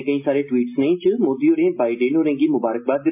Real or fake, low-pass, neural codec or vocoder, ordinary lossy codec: real; 3.6 kHz; none; MP3, 32 kbps